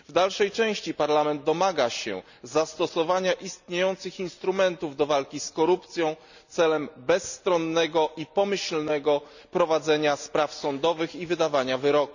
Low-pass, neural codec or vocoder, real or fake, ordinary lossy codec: 7.2 kHz; none; real; none